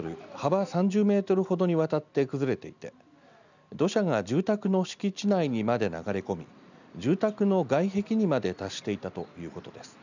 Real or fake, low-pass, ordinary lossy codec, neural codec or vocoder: real; 7.2 kHz; none; none